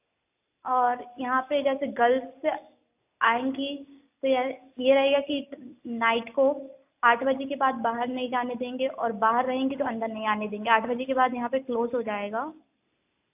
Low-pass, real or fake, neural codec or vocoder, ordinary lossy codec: 3.6 kHz; real; none; AAC, 32 kbps